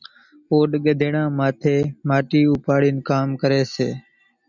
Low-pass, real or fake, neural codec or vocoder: 7.2 kHz; real; none